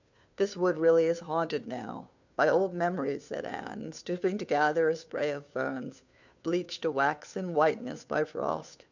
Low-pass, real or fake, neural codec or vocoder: 7.2 kHz; fake; codec, 16 kHz, 2 kbps, FunCodec, trained on Chinese and English, 25 frames a second